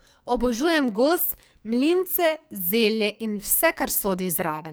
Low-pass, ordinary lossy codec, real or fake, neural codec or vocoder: none; none; fake; codec, 44.1 kHz, 2.6 kbps, SNAC